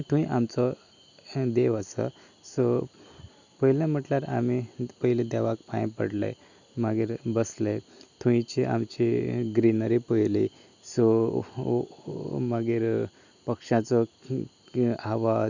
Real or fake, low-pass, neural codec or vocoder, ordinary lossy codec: real; 7.2 kHz; none; none